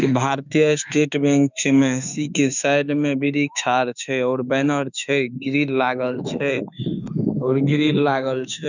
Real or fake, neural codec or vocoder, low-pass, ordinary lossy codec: fake; autoencoder, 48 kHz, 32 numbers a frame, DAC-VAE, trained on Japanese speech; 7.2 kHz; none